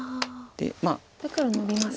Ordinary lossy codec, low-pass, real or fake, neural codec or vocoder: none; none; real; none